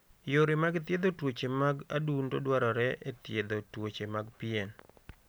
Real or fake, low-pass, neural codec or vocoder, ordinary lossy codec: real; none; none; none